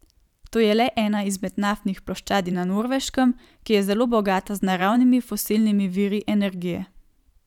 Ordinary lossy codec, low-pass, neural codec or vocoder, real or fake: none; 19.8 kHz; vocoder, 44.1 kHz, 128 mel bands every 512 samples, BigVGAN v2; fake